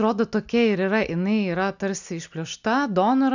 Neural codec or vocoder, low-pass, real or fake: none; 7.2 kHz; real